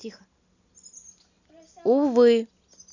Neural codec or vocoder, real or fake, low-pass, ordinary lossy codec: none; real; 7.2 kHz; none